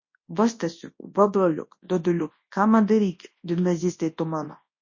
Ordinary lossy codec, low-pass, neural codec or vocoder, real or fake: MP3, 32 kbps; 7.2 kHz; codec, 24 kHz, 0.9 kbps, WavTokenizer, large speech release; fake